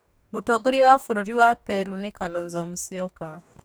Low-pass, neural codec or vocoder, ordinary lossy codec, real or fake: none; codec, 44.1 kHz, 2.6 kbps, DAC; none; fake